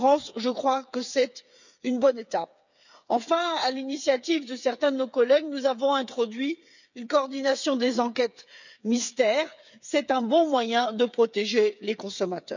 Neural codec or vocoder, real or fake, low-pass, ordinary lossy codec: codec, 16 kHz, 8 kbps, FreqCodec, smaller model; fake; 7.2 kHz; none